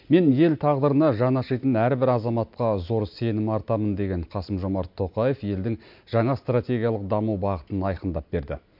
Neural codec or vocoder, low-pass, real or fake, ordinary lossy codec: none; 5.4 kHz; real; MP3, 48 kbps